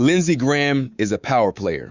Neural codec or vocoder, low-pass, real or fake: codec, 24 kHz, 3.1 kbps, DualCodec; 7.2 kHz; fake